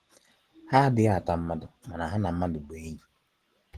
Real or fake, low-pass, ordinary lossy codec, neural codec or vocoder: real; 14.4 kHz; Opus, 16 kbps; none